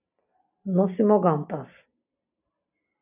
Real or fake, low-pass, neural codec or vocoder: real; 3.6 kHz; none